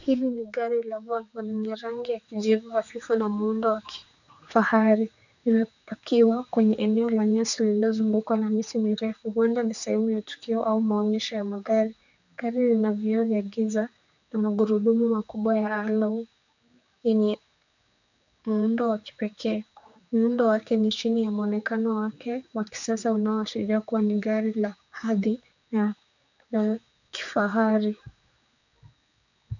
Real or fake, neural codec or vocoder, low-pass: fake; codec, 16 kHz, 4 kbps, X-Codec, HuBERT features, trained on general audio; 7.2 kHz